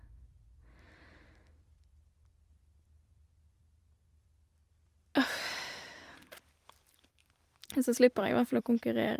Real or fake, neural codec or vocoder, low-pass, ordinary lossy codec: fake; vocoder, 44.1 kHz, 128 mel bands every 256 samples, BigVGAN v2; 14.4 kHz; Opus, 32 kbps